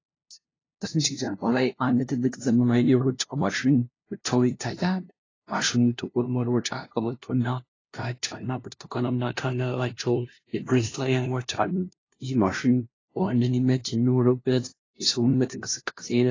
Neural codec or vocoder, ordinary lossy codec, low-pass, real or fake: codec, 16 kHz, 0.5 kbps, FunCodec, trained on LibriTTS, 25 frames a second; AAC, 32 kbps; 7.2 kHz; fake